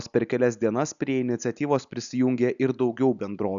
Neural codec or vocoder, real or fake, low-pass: none; real; 7.2 kHz